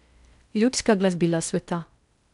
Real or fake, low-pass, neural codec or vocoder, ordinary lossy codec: fake; 10.8 kHz; codec, 16 kHz in and 24 kHz out, 0.6 kbps, FocalCodec, streaming, 4096 codes; none